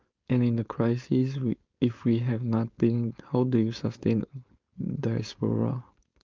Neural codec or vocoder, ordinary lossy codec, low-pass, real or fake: codec, 16 kHz, 4.8 kbps, FACodec; Opus, 24 kbps; 7.2 kHz; fake